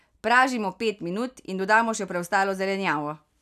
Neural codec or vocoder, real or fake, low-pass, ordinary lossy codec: none; real; 14.4 kHz; none